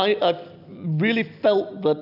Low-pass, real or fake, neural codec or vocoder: 5.4 kHz; real; none